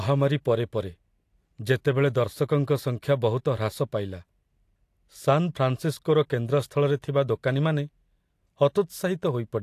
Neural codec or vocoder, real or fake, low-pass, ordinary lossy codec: none; real; 14.4 kHz; AAC, 64 kbps